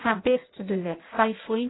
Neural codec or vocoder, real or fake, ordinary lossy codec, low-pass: codec, 16 kHz in and 24 kHz out, 0.6 kbps, FireRedTTS-2 codec; fake; AAC, 16 kbps; 7.2 kHz